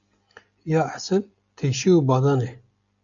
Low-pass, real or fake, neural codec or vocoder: 7.2 kHz; real; none